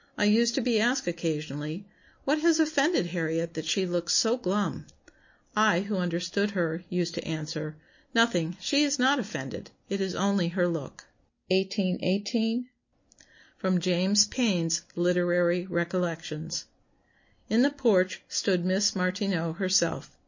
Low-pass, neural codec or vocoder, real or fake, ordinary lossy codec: 7.2 kHz; none; real; MP3, 32 kbps